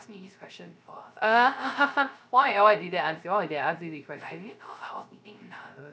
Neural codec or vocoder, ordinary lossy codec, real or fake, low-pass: codec, 16 kHz, 0.3 kbps, FocalCodec; none; fake; none